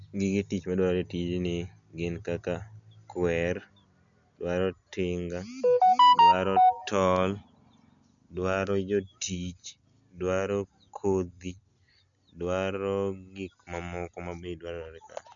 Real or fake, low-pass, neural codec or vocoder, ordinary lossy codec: real; 7.2 kHz; none; none